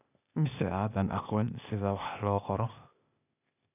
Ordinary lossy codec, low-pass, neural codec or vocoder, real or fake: none; 3.6 kHz; codec, 16 kHz, 0.8 kbps, ZipCodec; fake